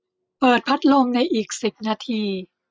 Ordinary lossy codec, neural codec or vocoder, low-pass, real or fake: none; none; none; real